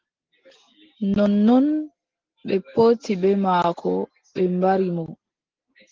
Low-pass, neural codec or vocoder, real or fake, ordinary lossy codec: 7.2 kHz; none; real; Opus, 16 kbps